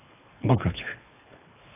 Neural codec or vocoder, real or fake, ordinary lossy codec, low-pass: codec, 24 kHz, 3 kbps, HILCodec; fake; AAC, 24 kbps; 3.6 kHz